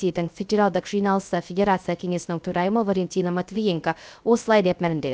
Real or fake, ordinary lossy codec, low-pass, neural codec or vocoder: fake; none; none; codec, 16 kHz, 0.3 kbps, FocalCodec